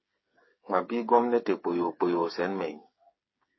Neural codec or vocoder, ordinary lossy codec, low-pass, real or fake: codec, 16 kHz, 8 kbps, FreqCodec, smaller model; MP3, 24 kbps; 7.2 kHz; fake